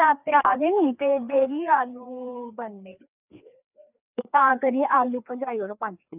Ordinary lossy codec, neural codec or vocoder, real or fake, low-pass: none; codec, 16 kHz, 2 kbps, FreqCodec, larger model; fake; 3.6 kHz